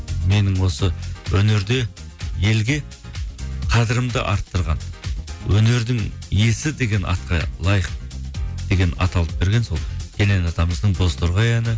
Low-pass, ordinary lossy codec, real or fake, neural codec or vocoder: none; none; real; none